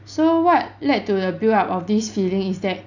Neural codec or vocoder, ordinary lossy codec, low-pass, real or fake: none; none; 7.2 kHz; real